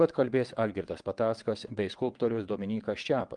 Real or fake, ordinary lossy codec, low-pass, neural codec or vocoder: fake; Opus, 24 kbps; 9.9 kHz; vocoder, 22.05 kHz, 80 mel bands, WaveNeXt